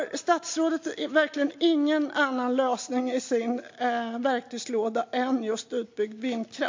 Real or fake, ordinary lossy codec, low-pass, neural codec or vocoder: real; MP3, 48 kbps; 7.2 kHz; none